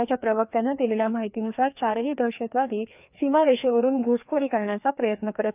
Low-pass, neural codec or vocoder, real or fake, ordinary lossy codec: 3.6 kHz; codec, 16 kHz, 2 kbps, FreqCodec, larger model; fake; none